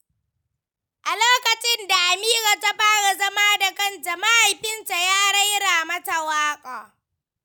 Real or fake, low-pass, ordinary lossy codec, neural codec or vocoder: real; none; none; none